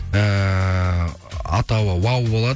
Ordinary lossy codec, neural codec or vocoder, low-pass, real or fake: none; none; none; real